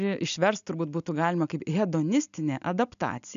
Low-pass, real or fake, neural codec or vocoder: 7.2 kHz; real; none